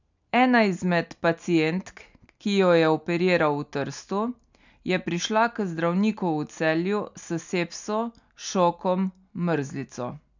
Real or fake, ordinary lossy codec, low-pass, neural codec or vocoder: real; none; 7.2 kHz; none